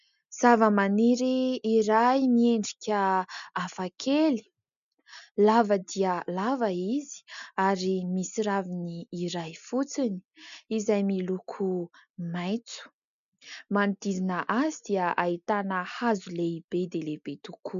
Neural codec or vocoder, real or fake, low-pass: none; real; 7.2 kHz